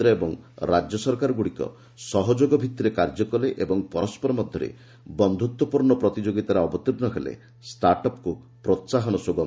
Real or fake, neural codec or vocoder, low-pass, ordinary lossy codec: real; none; none; none